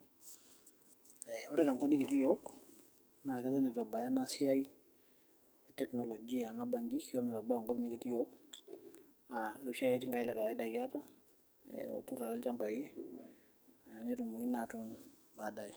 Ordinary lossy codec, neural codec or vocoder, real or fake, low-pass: none; codec, 44.1 kHz, 2.6 kbps, SNAC; fake; none